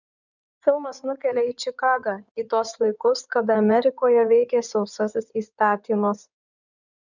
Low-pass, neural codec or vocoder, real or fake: 7.2 kHz; codec, 16 kHz in and 24 kHz out, 2.2 kbps, FireRedTTS-2 codec; fake